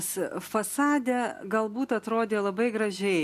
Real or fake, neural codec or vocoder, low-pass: real; none; 14.4 kHz